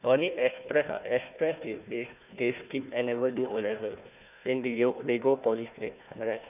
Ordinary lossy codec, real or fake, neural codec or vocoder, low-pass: none; fake; codec, 16 kHz, 1 kbps, FunCodec, trained on Chinese and English, 50 frames a second; 3.6 kHz